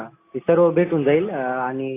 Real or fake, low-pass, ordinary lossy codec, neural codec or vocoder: real; 3.6 kHz; AAC, 24 kbps; none